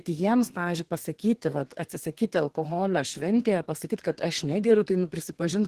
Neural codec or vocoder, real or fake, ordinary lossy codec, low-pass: codec, 32 kHz, 1.9 kbps, SNAC; fake; Opus, 16 kbps; 14.4 kHz